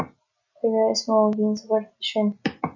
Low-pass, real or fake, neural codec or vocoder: 7.2 kHz; real; none